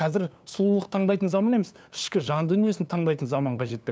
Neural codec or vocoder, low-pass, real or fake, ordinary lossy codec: codec, 16 kHz, 2 kbps, FunCodec, trained on LibriTTS, 25 frames a second; none; fake; none